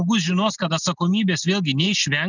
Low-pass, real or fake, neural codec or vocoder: 7.2 kHz; real; none